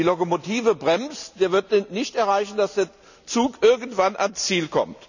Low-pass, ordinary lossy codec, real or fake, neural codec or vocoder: 7.2 kHz; none; real; none